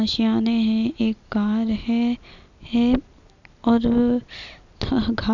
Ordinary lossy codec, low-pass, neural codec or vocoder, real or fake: none; 7.2 kHz; none; real